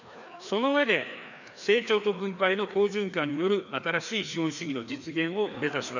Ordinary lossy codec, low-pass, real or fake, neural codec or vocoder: none; 7.2 kHz; fake; codec, 16 kHz, 2 kbps, FreqCodec, larger model